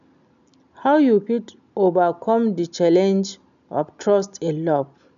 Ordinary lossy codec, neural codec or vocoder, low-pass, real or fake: none; none; 7.2 kHz; real